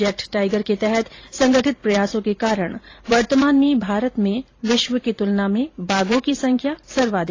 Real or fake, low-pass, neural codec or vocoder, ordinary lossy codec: fake; 7.2 kHz; vocoder, 44.1 kHz, 128 mel bands every 512 samples, BigVGAN v2; AAC, 32 kbps